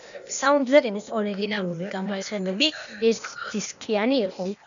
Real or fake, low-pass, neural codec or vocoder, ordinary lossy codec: fake; 7.2 kHz; codec, 16 kHz, 0.8 kbps, ZipCodec; MP3, 96 kbps